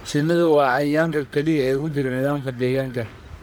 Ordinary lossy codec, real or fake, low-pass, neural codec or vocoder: none; fake; none; codec, 44.1 kHz, 1.7 kbps, Pupu-Codec